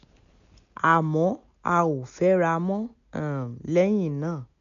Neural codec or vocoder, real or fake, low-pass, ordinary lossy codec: none; real; 7.2 kHz; none